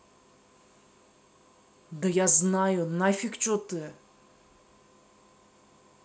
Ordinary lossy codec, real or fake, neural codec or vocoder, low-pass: none; real; none; none